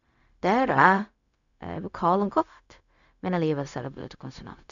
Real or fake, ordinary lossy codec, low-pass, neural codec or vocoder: fake; none; 7.2 kHz; codec, 16 kHz, 0.4 kbps, LongCat-Audio-Codec